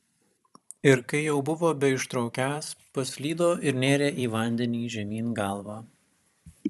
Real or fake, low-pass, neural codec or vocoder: fake; 14.4 kHz; vocoder, 44.1 kHz, 128 mel bands every 512 samples, BigVGAN v2